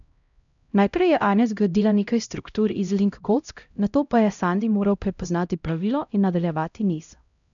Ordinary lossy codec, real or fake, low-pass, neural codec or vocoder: none; fake; 7.2 kHz; codec, 16 kHz, 0.5 kbps, X-Codec, HuBERT features, trained on LibriSpeech